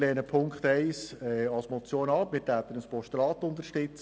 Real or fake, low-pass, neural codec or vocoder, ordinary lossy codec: real; none; none; none